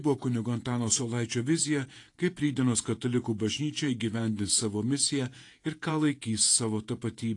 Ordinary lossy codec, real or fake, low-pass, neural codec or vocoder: AAC, 48 kbps; fake; 10.8 kHz; vocoder, 48 kHz, 128 mel bands, Vocos